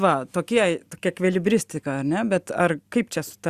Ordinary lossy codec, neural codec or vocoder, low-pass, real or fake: Opus, 64 kbps; none; 14.4 kHz; real